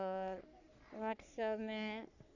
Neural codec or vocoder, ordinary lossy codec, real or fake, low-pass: codec, 44.1 kHz, 3.4 kbps, Pupu-Codec; none; fake; 7.2 kHz